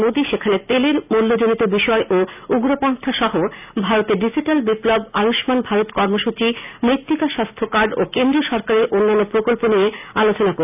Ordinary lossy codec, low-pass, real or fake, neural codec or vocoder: none; 3.6 kHz; real; none